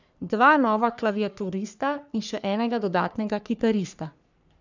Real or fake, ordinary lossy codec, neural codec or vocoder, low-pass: fake; none; codec, 44.1 kHz, 3.4 kbps, Pupu-Codec; 7.2 kHz